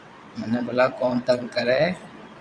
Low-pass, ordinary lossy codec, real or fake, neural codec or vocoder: 9.9 kHz; Opus, 64 kbps; fake; vocoder, 22.05 kHz, 80 mel bands, WaveNeXt